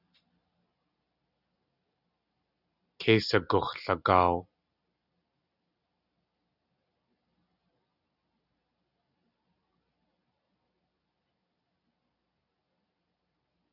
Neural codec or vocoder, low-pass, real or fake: none; 5.4 kHz; real